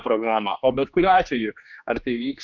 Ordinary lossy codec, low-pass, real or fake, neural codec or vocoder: MP3, 64 kbps; 7.2 kHz; fake; codec, 16 kHz, 2 kbps, X-Codec, HuBERT features, trained on general audio